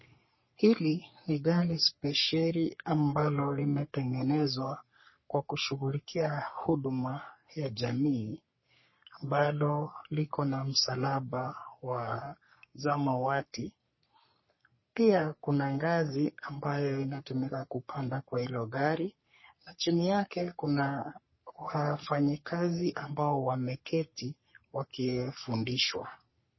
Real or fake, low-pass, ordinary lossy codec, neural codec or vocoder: fake; 7.2 kHz; MP3, 24 kbps; codec, 44.1 kHz, 3.4 kbps, Pupu-Codec